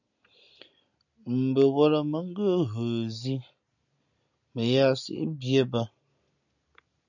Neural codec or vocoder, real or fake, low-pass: none; real; 7.2 kHz